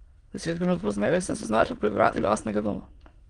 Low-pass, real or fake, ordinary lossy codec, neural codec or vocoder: 9.9 kHz; fake; Opus, 24 kbps; autoencoder, 22.05 kHz, a latent of 192 numbers a frame, VITS, trained on many speakers